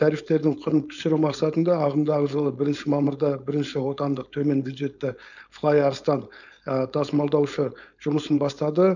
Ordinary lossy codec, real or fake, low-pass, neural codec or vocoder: none; fake; 7.2 kHz; codec, 16 kHz, 4.8 kbps, FACodec